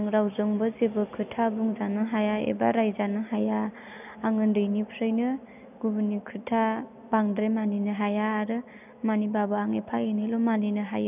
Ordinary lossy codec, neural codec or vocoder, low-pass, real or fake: none; none; 3.6 kHz; real